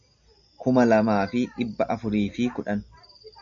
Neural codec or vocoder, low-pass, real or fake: none; 7.2 kHz; real